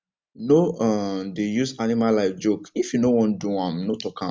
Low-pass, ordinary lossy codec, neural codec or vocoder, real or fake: none; none; none; real